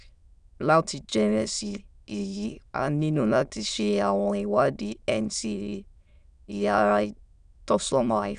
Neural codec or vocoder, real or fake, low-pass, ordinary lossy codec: autoencoder, 22.05 kHz, a latent of 192 numbers a frame, VITS, trained on many speakers; fake; 9.9 kHz; none